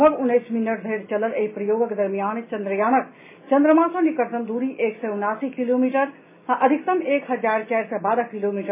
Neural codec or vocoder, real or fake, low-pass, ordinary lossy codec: none; real; 3.6 kHz; MP3, 16 kbps